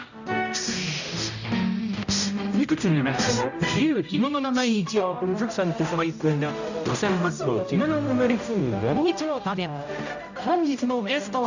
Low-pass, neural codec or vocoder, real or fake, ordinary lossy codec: 7.2 kHz; codec, 16 kHz, 0.5 kbps, X-Codec, HuBERT features, trained on general audio; fake; none